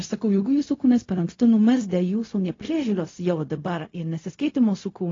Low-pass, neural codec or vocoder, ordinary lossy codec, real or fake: 7.2 kHz; codec, 16 kHz, 0.4 kbps, LongCat-Audio-Codec; AAC, 32 kbps; fake